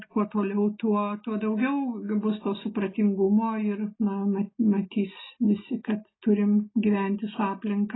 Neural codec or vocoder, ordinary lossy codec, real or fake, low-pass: none; AAC, 16 kbps; real; 7.2 kHz